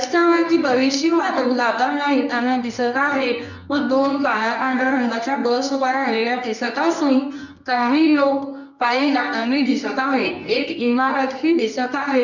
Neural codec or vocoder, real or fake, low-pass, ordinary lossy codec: codec, 24 kHz, 0.9 kbps, WavTokenizer, medium music audio release; fake; 7.2 kHz; none